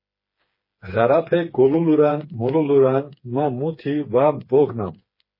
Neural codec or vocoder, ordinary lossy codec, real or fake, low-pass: codec, 16 kHz, 4 kbps, FreqCodec, smaller model; MP3, 24 kbps; fake; 5.4 kHz